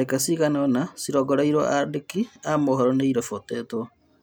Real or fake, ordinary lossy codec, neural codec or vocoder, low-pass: real; none; none; none